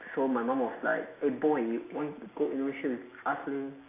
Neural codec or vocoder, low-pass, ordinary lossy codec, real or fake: none; 3.6 kHz; AAC, 24 kbps; real